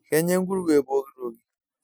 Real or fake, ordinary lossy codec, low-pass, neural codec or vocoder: real; none; none; none